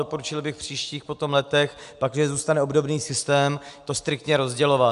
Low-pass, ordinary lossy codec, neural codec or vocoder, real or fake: 10.8 kHz; AAC, 96 kbps; none; real